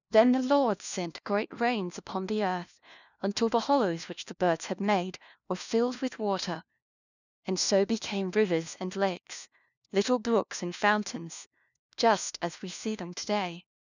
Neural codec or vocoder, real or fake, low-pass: codec, 16 kHz, 1 kbps, FunCodec, trained on LibriTTS, 50 frames a second; fake; 7.2 kHz